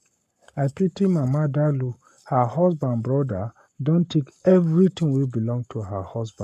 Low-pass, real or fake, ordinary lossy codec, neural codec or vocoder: 14.4 kHz; fake; none; codec, 44.1 kHz, 7.8 kbps, Pupu-Codec